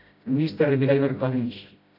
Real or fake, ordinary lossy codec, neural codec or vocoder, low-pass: fake; AAC, 32 kbps; codec, 16 kHz, 0.5 kbps, FreqCodec, smaller model; 5.4 kHz